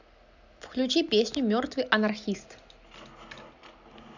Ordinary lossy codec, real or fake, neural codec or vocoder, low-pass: none; real; none; 7.2 kHz